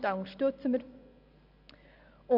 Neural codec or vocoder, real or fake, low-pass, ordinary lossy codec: none; real; 5.4 kHz; none